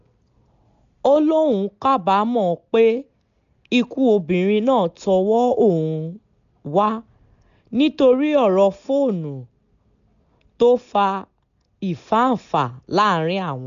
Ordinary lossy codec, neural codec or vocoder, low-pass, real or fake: none; none; 7.2 kHz; real